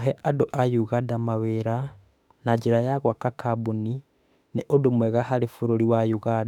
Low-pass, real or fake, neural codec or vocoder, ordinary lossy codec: 19.8 kHz; fake; autoencoder, 48 kHz, 32 numbers a frame, DAC-VAE, trained on Japanese speech; none